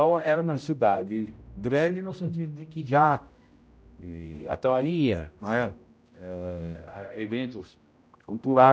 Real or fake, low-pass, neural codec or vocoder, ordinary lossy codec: fake; none; codec, 16 kHz, 0.5 kbps, X-Codec, HuBERT features, trained on general audio; none